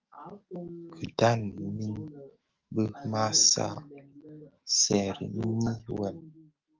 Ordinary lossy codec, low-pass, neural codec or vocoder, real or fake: Opus, 32 kbps; 7.2 kHz; autoencoder, 48 kHz, 128 numbers a frame, DAC-VAE, trained on Japanese speech; fake